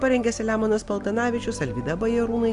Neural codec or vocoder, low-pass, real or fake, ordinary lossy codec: none; 10.8 kHz; real; Opus, 64 kbps